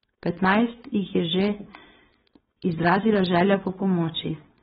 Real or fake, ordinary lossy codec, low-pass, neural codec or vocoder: fake; AAC, 16 kbps; 7.2 kHz; codec, 16 kHz, 4.8 kbps, FACodec